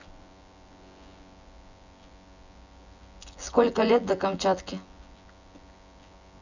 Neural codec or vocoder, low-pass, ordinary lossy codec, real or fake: vocoder, 24 kHz, 100 mel bands, Vocos; 7.2 kHz; none; fake